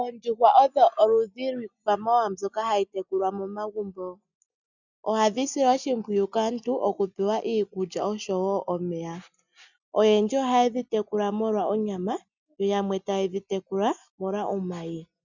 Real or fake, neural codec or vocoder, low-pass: real; none; 7.2 kHz